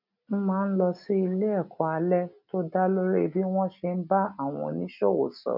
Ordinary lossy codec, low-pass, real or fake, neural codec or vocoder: none; 5.4 kHz; real; none